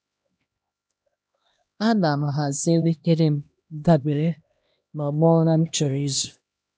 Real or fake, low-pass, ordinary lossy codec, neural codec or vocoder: fake; none; none; codec, 16 kHz, 1 kbps, X-Codec, HuBERT features, trained on LibriSpeech